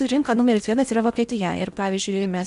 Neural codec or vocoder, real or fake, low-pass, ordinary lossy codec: codec, 16 kHz in and 24 kHz out, 0.8 kbps, FocalCodec, streaming, 65536 codes; fake; 10.8 kHz; MP3, 96 kbps